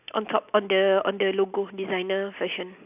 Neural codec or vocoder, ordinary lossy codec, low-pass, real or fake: none; none; 3.6 kHz; real